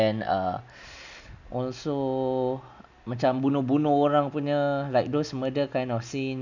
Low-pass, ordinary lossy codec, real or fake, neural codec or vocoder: 7.2 kHz; none; real; none